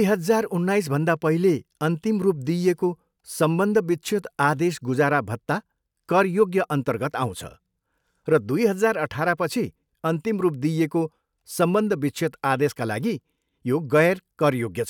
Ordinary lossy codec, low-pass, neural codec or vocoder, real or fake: none; 19.8 kHz; none; real